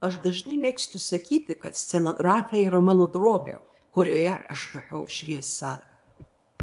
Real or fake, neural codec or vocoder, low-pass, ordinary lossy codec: fake; codec, 24 kHz, 0.9 kbps, WavTokenizer, small release; 10.8 kHz; AAC, 64 kbps